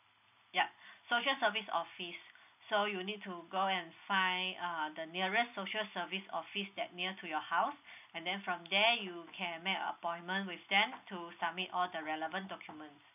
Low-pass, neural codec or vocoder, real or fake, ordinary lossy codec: 3.6 kHz; none; real; none